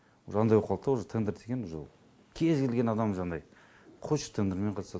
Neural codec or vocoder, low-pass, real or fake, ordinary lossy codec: none; none; real; none